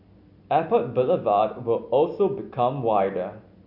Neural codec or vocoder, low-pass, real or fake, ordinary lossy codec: none; 5.4 kHz; real; none